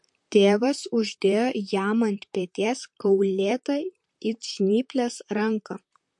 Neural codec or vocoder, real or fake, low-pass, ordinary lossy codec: vocoder, 44.1 kHz, 128 mel bands, Pupu-Vocoder; fake; 10.8 kHz; MP3, 48 kbps